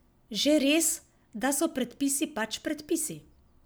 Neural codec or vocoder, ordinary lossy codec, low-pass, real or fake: none; none; none; real